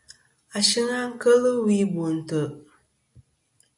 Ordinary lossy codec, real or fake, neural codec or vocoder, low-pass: MP3, 96 kbps; real; none; 10.8 kHz